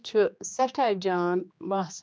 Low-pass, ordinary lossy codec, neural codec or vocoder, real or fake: none; none; codec, 16 kHz, 2 kbps, X-Codec, HuBERT features, trained on general audio; fake